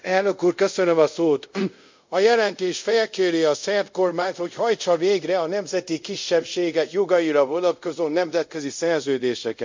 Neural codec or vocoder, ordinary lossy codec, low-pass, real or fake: codec, 24 kHz, 0.5 kbps, DualCodec; MP3, 64 kbps; 7.2 kHz; fake